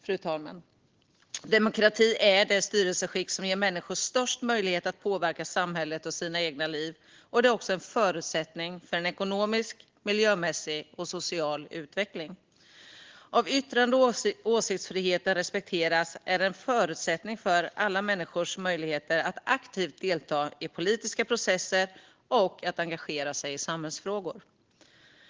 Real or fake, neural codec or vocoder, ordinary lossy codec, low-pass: real; none; Opus, 16 kbps; 7.2 kHz